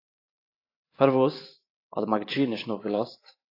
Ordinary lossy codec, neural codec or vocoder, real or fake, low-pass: AAC, 32 kbps; vocoder, 44.1 kHz, 128 mel bands every 512 samples, BigVGAN v2; fake; 5.4 kHz